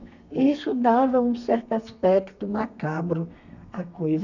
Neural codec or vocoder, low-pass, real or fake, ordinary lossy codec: codec, 32 kHz, 1.9 kbps, SNAC; 7.2 kHz; fake; none